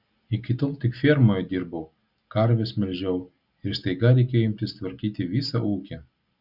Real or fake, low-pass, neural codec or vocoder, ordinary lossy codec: real; 5.4 kHz; none; Opus, 64 kbps